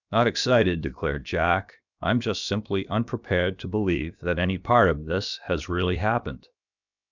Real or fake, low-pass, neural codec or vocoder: fake; 7.2 kHz; codec, 16 kHz, about 1 kbps, DyCAST, with the encoder's durations